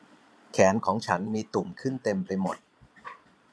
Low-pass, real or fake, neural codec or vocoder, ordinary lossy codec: none; fake; vocoder, 22.05 kHz, 80 mel bands, Vocos; none